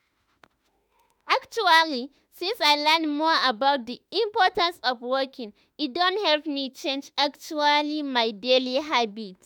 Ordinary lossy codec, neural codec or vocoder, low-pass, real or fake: none; autoencoder, 48 kHz, 32 numbers a frame, DAC-VAE, trained on Japanese speech; none; fake